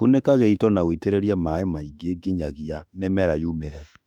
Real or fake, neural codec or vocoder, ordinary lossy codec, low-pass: fake; autoencoder, 48 kHz, 32 numbers a frame, DAC-VAE, trained on Japanese speech; none; 19.8 kHz